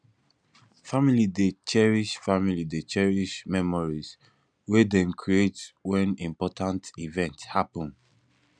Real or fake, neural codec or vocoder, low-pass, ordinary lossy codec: real; none; 9.9 kHz; none